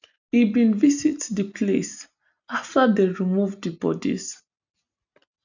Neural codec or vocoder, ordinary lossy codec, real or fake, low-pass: none; none; real; 7.2 kHz